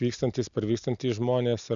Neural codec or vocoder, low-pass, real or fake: none; 7.2 kHz; real